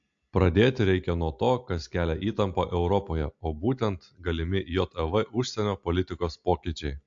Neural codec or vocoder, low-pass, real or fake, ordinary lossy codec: none; 7.2 kHz; real; AAC, 64 kbps